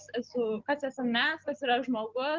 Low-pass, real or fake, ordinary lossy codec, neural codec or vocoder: 7.2 kHz; real; Opus, 24 kbps; none